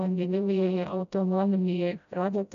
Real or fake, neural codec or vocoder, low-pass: fake; codec, 16 kHz, 0.5 kbps, FreqCodec, smaller model; 7.2 kHz